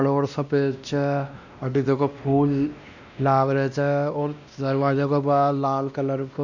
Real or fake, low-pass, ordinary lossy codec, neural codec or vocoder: fake; 7.2 kHz; none; codec, 16 kHz, 1 kbps, X-Codec, WavLM features, trained on Multilingual LibriSpeech